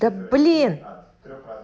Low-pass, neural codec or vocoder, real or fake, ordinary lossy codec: none; none; real; none